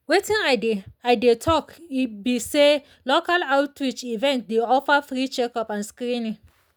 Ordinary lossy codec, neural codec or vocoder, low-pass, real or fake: none; none; none; real